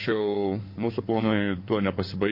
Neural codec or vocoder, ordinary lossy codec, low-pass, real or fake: codec, 16 kHz in and 24 kHz out, 2.2 kbps, FireRedTTS-2 codec; MP3, 32 kbps; 5.4 kHz; fake